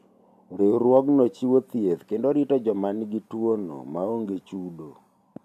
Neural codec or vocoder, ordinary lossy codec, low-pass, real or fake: none; none; 14.4 kHz; real